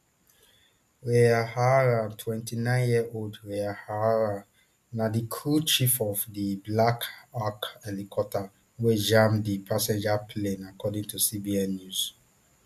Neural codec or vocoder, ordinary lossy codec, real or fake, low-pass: none; MP3, 96 kbps; real; 14.4 kHz